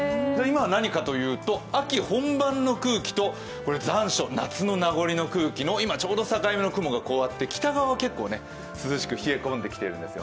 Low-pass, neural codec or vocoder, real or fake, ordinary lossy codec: none; none; real; none